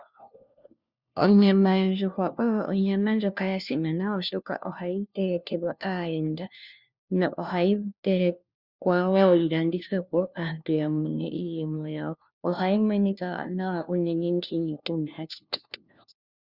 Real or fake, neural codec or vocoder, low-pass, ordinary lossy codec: fake; codec, 16 kHz, 1 kbps, FunCodec, trained on LibriTTS, 50 frames a second; 5.4 kHz; Opus, 64 kbps